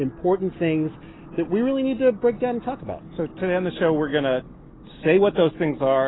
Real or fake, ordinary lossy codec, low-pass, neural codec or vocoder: real; AAC, 16 kbps; 7.2 kHz; none